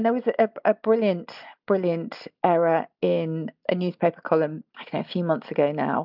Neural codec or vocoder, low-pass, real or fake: none; 5.4 kHz; real